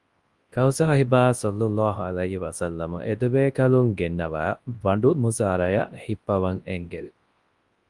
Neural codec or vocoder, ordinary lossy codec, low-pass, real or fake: codec, 24 kHz, 0.9 kbps, WavTokenizer, large speech release; Opus, 24 kbps; 10.8 kHz; fake